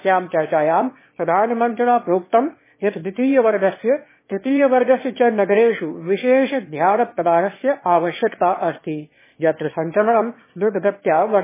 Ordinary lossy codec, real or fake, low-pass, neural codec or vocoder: MP3, 16 kbps; fake; 3.6 kHz; autoencoder, 22.05 kHz, a latent of 192 numbers a frame, VITS, trained on one speaker